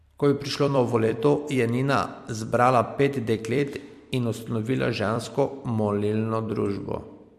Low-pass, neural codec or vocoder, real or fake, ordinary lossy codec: 14.4 kHz; vocoder, 44.1 kHz, 128 mel bands every 256 samples, BigVGAN v2; fake; MP3, 64 kbps